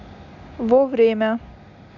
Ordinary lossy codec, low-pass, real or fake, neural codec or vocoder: none; 7.2 kHz; real; none